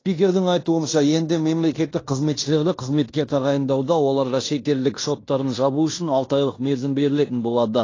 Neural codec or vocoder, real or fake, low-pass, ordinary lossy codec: codec, 16 kHz in and 24 kHz out, 0.9 kbps, LongCat-Audio-Codec, fine tuned four codebook decoder; fake; 7.2 kHz; AAC, 32 kbps